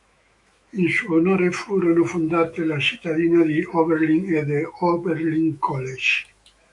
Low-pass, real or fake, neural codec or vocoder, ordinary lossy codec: 10.8 kHz; fake; autoencoder, 48 kHz, 128 numbers a frame, DAC-VAE, trained on Japanese speech; MP3, 64 kbps